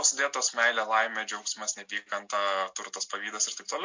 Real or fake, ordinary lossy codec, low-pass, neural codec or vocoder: real; MP3, 48 kbps; 7.2 kHz; none